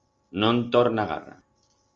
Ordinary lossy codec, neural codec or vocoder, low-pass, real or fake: Opus, 32 kbps; none; 7.2 kHz; real